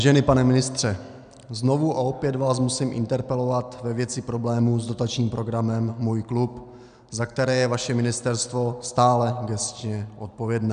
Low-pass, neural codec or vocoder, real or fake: 9.9 kHz; none; real